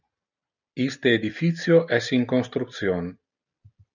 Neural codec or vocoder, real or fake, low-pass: none; real; 7.2 kHz